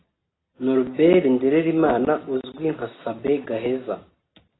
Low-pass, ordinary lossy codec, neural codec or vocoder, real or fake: 7.2 kHz; AAC, 16 kbps; none; real